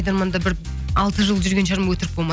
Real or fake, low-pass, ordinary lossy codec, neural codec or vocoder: real; none; none; none